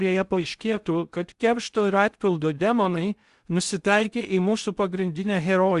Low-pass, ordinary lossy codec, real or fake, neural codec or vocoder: 10.8 kHz; Opus, 64 kbps; fake; codec, 16 kHz in and 24 kHz out, 0.6 kbps, FocalCodec, streaming, 2048 codes